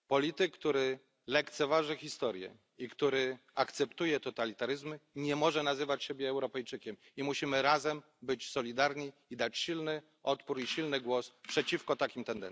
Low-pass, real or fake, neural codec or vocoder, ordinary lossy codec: none; real; none; none